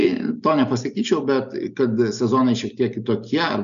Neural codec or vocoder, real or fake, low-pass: none; real; 7.2 kHz